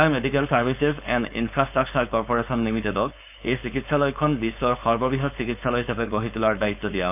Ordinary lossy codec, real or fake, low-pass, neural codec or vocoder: none; fake; 3.6 kHz; codec, 16 kHz, 4.8 kbps, FACodec